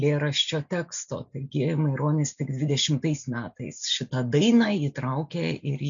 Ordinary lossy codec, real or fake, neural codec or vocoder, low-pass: MP3, 64 kbps; real; none; 7.2 kHz